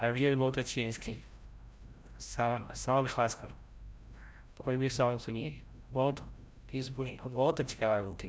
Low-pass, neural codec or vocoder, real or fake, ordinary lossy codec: none; codec, 16 kHz, 0.5 kbps, FreqCodec, larger model; fake; none